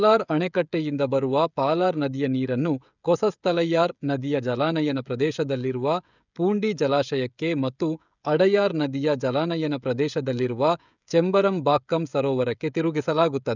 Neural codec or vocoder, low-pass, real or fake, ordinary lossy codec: codec, 16 kHz, 16 kbps, FreqCodec, smaller model; 7.2 kHz; fake; none